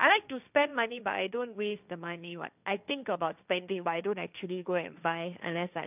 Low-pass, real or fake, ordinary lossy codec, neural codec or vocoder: 3.6 kHz; fake; none; codec, 16 kHz, 1.1 kbps, Voila-Tokenizer